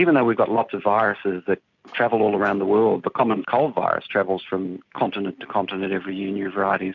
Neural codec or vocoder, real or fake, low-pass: none; real; 7.2 kHz